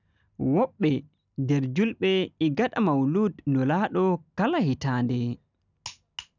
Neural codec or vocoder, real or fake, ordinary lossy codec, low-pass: none; real; none; 7.2 kHz